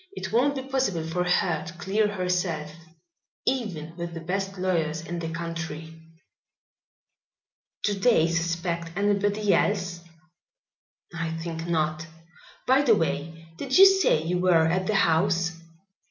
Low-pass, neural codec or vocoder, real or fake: 7.2 kHz; none; real